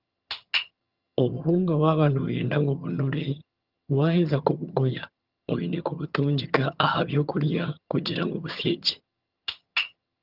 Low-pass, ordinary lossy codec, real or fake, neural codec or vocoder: 5.4 kHz; Opus, 32 kbps; fake; vocoder, 22.05 kHz, 80 mel bands, HiFi-GAN